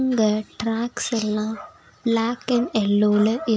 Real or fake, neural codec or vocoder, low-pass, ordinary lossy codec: real; none; none; none